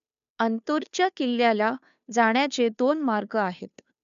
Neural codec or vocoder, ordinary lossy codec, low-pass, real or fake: codec, 16 kHz, 2 kbps, FunCodec, trained on Chinese and English, 25 frames a second; MP3, 96 kbps; 7.2 kHz; fake